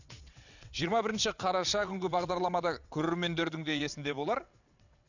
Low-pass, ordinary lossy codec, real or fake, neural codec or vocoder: 7.2 kHz; none; fake; vocoder, 22.05 kHz, 80 mel bands, WaveNeXt